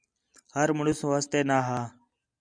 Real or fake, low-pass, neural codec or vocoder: real; 9.9 kHz; none